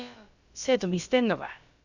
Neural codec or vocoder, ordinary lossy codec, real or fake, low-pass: codec, 16 kHz, about 1 kbps, DyCAST, with the encoder's durations; none; fake; 7.2 kHz